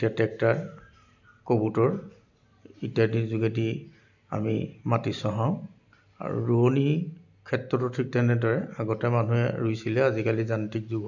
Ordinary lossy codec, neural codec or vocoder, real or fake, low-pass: none; none; real; 7.2 kHz